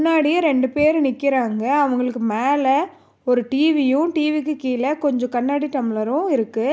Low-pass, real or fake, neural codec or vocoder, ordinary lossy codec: none; real; none; none